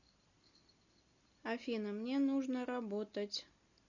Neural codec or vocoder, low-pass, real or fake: none; 7.2 kHz; real